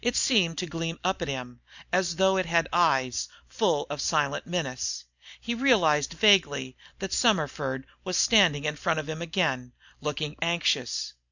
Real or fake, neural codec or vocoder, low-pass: real; none; 7.2 kHz